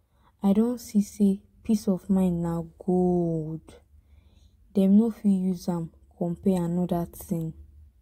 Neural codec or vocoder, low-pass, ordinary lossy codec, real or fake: none; 19.8 kHz; AAC, 48 kbps; real